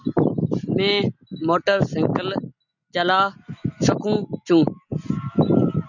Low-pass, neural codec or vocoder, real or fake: 7.2 kHz; none; real